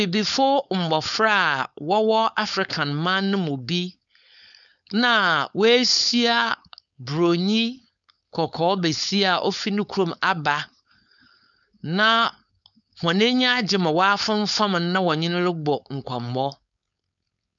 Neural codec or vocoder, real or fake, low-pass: codec, 16 kHz, 4.8 kbps, FACodec; fake; 7.2 kHz